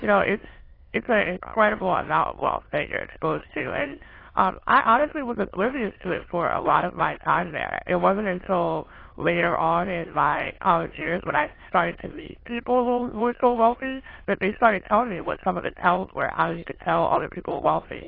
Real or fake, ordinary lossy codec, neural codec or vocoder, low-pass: fake; AAC, 24 kbps; autoencoder, 22.05 kHz, a latent of 192 numbers a frame, VITS, trained on many speakers; 5.4 kHz